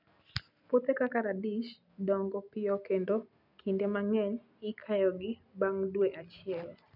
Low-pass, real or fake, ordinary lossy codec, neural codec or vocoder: 5.4 kHz; real; none; none